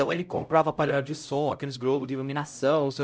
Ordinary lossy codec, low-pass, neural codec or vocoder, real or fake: none; none; codec, 16 kHz, 0.5 kbps, X-Codec, HuBERT features, trained on LibriSpeech; fake